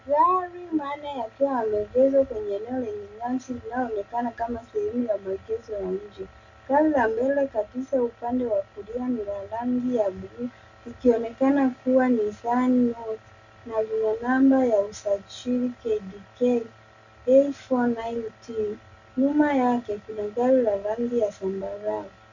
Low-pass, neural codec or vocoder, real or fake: 7.2 kHz; none; real